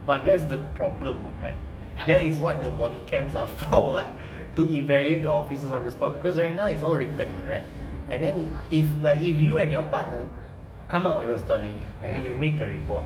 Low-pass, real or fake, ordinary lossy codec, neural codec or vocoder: 19.8 kHz; fake; none; codec, 44.1 kHz, 2.6 kbps, DAC